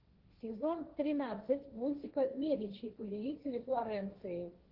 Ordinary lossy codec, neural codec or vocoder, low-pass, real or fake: Opus, 16 kbps; codec, 16 kHz, 1.1 kbps, Voila-Tokenizer; 5.4 kHz; fake